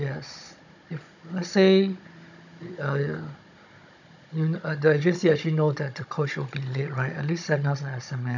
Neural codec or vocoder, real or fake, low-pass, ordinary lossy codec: codec, 16 kHz, 16 kbps, FunCodec, trained on Chinese and English, 50 frames a second; fake; 7.2 kHz; none